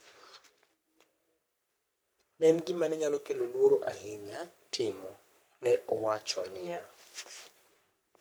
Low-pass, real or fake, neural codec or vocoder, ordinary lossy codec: none; fake; codec, 44.1 kHz, 3.4 kbps, Pupu-Codec; none